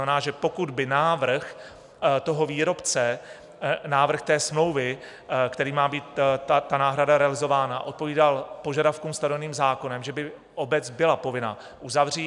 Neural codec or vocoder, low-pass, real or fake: none; 10.8 kHz; real